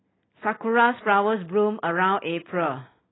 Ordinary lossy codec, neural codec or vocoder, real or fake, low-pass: AAC, 16 kbps; none; real; 7.2 kHz